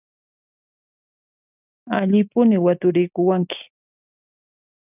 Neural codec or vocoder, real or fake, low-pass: none; real; 3.6 kHz